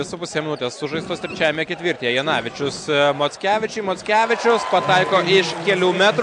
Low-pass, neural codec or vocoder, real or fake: 9.9 kHz; none; real